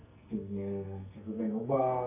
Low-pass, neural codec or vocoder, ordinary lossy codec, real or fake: 3.6 kHz; codec, 44.1 kHz, 2.6 kbps, SNAC; none; fake